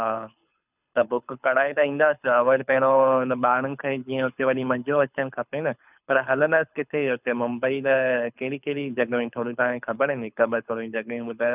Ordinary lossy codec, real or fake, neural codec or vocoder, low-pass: none; fake; codec, 24 kHz, 6 kbps, HILCodec; 3.6 kHz